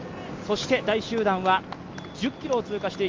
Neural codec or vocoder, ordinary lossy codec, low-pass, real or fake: none; Opus, 32 kbps; 7.2 kHz; real